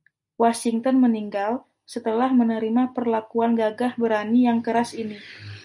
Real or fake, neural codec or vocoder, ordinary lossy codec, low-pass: real; none; MP3, 96 kbps; 10.8 kHz